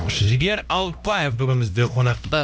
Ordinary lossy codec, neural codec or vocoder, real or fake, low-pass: none; codec, 16 kHz, 1 kbps, X-Codec, HuBERT features, trained on LibriSpeech; fake; none